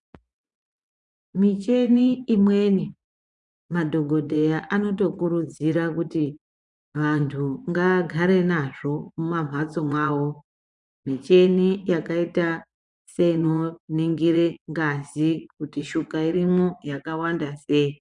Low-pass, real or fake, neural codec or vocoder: 10.8 kHz; fake; vocoder, 24 kHz, 100 mel bands, Vocos